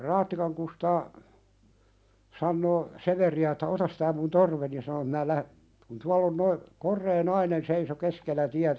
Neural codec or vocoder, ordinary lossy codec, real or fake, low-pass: none; none; real; none